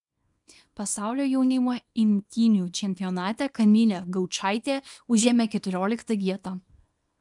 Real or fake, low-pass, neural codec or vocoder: fake; 10.8 kHz; codec, 24 kHz, 0.9 kbps, WavTokenizer, small release